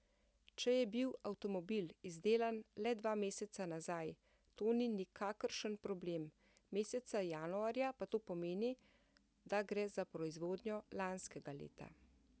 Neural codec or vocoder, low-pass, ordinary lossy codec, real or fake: none; none; none; real